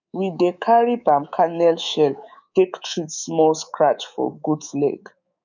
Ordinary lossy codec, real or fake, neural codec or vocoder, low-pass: none; fake; codec, 24 kHz, 3.1 kbps, DualCodec; 7.2 kHz